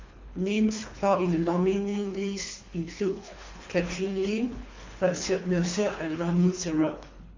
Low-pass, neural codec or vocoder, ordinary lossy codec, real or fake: 7.2 kHz; codec, 24 kHz, 3 kbps, HILCodec; MP3, 48 kbps; fake